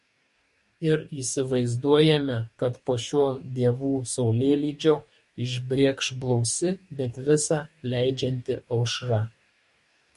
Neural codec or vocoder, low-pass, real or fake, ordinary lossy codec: codec, 44.1 kHz, 2.6 kbps, DAC; 14.4 kHz; fake; MP3, 48 kbps